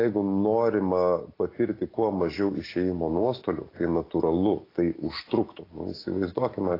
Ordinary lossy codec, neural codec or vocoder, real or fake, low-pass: AAC, 24 kbps; none; real; 5.4 kHz